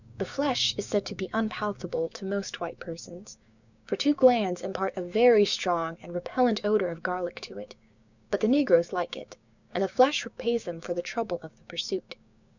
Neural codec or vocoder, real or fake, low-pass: codec, 16 kHz, 6 kbps, DAC; fake; 7.2 kHz